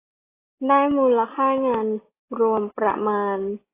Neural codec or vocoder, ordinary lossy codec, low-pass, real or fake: none; AAC, 16 kbps; 3.6 kHz; real